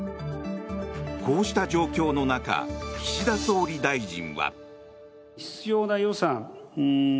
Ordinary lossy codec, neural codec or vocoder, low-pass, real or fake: none; none; none; real